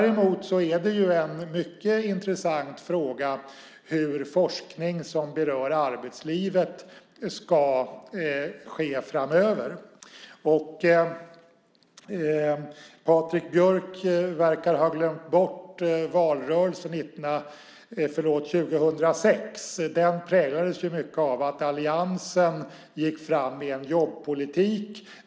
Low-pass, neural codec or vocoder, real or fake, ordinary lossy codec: none; none; real; none